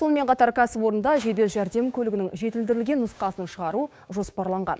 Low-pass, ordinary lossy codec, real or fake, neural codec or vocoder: none; none; fake; codec, 16 kHz, 6 kbps, DAC